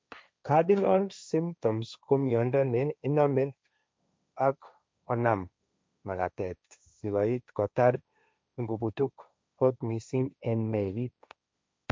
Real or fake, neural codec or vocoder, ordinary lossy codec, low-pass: fake; codec, 16 kHz, 1.1 kbps, Voila-Tokenizer; none; none